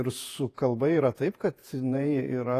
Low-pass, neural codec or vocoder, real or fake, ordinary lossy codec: 14.4 kHz; vocoder, 48 kHz, 128 mel bands, Vocos; fake; AAC, 48 kbps